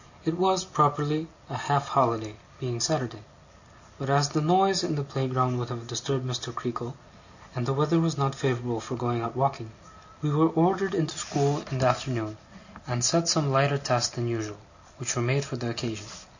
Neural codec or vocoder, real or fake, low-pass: none; real; 7.2 kHz